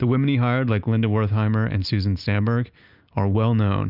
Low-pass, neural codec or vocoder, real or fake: 5.4 kHz; none; real